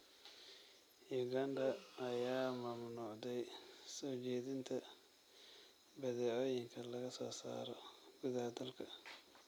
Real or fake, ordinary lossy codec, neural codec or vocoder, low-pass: real; none; none; none